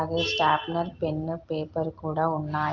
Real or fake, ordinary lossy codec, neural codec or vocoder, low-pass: real; Opus, 24 kbps; none; 7.2 kHz